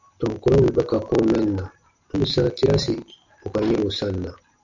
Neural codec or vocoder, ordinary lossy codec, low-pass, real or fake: none; MP3, 64 kbps; 7.2 kHz; real